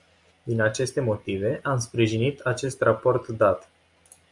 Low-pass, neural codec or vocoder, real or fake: 10.8 kHz; none; real